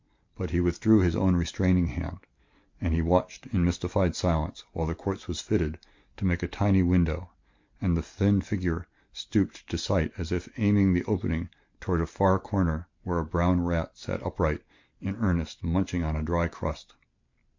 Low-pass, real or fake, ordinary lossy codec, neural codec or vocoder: 7.2 kHz; real; MP3, 48 kbps; none